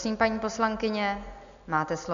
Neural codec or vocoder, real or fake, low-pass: none; real; 7.2 kHz